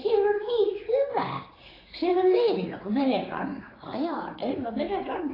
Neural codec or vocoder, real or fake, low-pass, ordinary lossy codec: codec, 16 kHz, 4 kbps, X-Codec, HuBERT features, trained on general audio; fake; 5.4 kHz; AAC, 24 kbps